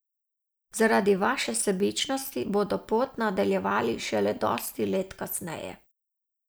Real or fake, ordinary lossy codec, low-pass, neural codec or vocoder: real; none; none; none